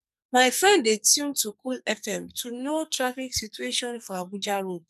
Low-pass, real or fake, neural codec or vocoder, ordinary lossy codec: 14.4 kHz; fake; codec, 44.1 kHz, 2.6 kbps, SNAC; none